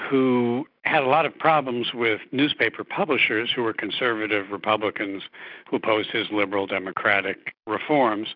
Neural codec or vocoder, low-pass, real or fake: none; 5.4 kHz; real